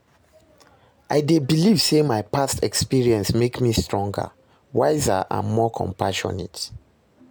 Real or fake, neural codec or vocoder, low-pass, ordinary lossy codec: real; none; none; none